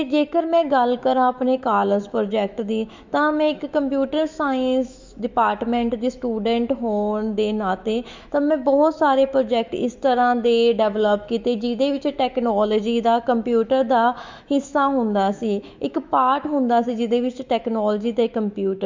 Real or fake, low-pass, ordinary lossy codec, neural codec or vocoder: fake; 7.2 kHz; MP3, 64 kbps; autoencoder, 48 kHz, 128 numbers a frame, DAC-VAE, trained on Japanese speech